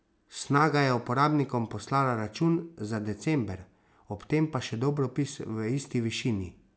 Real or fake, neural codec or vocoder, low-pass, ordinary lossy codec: real; none; none; none